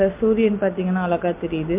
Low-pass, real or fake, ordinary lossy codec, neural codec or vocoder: 3.6 kHz; real; MP3, 32 kbps; none